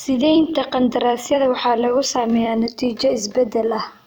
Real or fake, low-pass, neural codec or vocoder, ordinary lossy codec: fake; none; vocoder, 44.1 kHz, 128 mel bands every 256 samples, BigVGAN v2; none